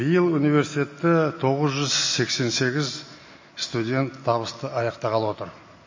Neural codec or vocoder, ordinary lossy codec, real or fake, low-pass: none; MP3, 32 kbps; real; 7.2 kHz